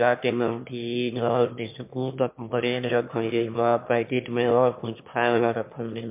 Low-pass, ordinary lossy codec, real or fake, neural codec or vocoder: 3.6 kHz; MP3, 32 kbps; fake; autoencoder, 22.05 kHz, a latent of 192 numbers a frame, VITS, trained on one speaker